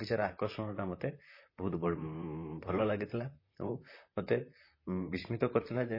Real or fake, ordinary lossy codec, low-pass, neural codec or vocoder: fake; MP3, 24 kbps; 5.4 kHz; vocoder, 44.1 kHz, 128 mel bands, Pupu-Vocoder